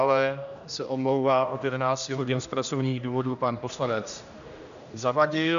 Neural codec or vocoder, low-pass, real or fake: codec, 16 kHz, 1 kbps, X-Codec, HuBERT features, trained on general audio; 7.2 kHz; fake